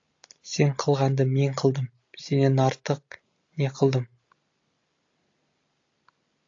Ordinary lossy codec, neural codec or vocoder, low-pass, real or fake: AAC, 48 kbps; none; 7.2 kHz; real